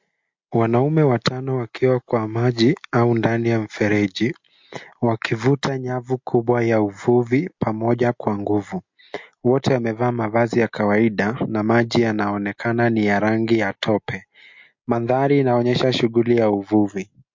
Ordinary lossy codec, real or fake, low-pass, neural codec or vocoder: MP3, 48 kbps; real; 7.2 kHz; none